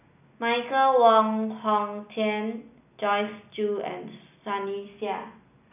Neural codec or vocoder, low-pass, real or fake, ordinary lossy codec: none; 3.6 kHz; real; none